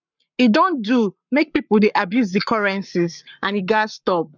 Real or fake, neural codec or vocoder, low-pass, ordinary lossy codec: fake; codec, 44.1 kHz, 7.8 kbps, Pupu-Codec; 7.2 kHz; none